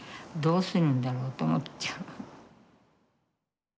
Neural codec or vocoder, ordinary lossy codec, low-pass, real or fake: none; none; none; real